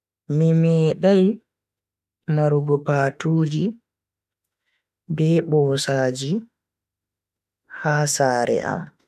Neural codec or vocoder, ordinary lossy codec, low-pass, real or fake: autoencoder, 48 kHz, 32 numbers a frame, DAC-VAE, trained on Japanese speech; none; 14.4 kHz; fake